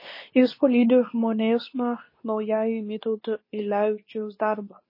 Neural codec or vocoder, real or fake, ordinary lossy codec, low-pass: codec, 24 kHz, 0.9 kbps, WavTokenizer, medium speech release version 2; fake; MP3, 24 kbps; 5.4 kHz